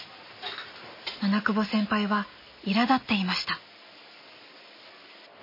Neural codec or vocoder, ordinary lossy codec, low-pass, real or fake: none; MP3, 24 kbps; 5.4 kHz; real